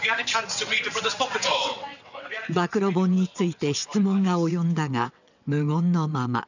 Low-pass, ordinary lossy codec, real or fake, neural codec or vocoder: 7.2 kHz; none; fake; vocoder, 22.05 kHz, 80 mel bands, WaveNeXt